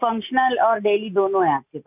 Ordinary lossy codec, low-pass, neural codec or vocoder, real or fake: none; 3.6 kHz; none; real